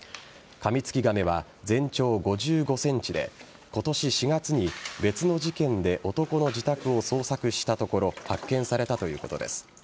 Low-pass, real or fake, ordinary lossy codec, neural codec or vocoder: none; real; none; none